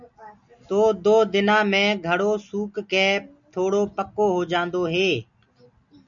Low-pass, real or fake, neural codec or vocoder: 7.2 kHz; real; none